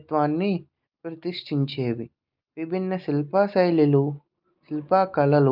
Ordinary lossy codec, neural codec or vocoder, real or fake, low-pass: Opus, 24 kbps; none; real; 5.4 kHz